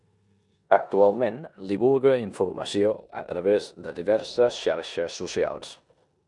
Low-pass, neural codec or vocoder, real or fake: 10.8 kHz; codec, 16 kHz in and 24 kHz out, 0.9 kbps, LongCat-Audio-Codec, four codebook decoder; fake